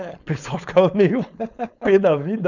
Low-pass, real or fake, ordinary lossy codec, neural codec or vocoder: 7.2 kHz; fake; none; codec, 16 kHz, 4.8 kbps, FACodec